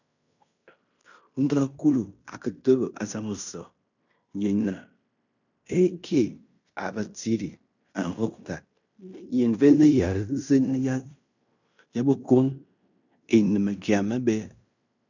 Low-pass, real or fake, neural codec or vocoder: 7.2 kHz; fake; codec, 16 kHz in and 24 kHz out, 0.9 kbps, LongCat-Audio-Codec, fine tuned four codebook decoder